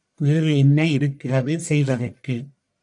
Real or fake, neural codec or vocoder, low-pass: fake; codec, 44.1 kHz, 1.7 kbps, Pupu-Codec; 10.8 kHz